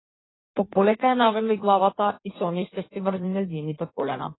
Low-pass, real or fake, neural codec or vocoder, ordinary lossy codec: 7.2 kHz; fake; codec, 16 kHz in and 24 kHz out, 1.1 kbps, FireRedTTS-2 codec; AAC, 16 kbps